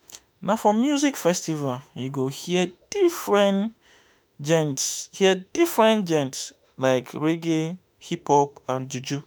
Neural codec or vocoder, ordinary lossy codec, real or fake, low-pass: autoencoder, 48 kHz, 32 numbers a frame, DAC-VAE, trained on Japanese speech; none; fake; none